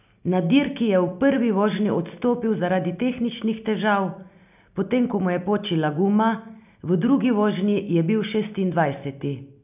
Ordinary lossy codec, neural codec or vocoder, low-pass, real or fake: none; none; 3.6 kHz; real